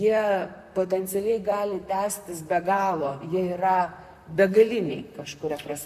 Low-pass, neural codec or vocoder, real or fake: 14.4 kHz; vocoder, 44.1 kHz, 128 mel bands, Pupu-Vocoder; fake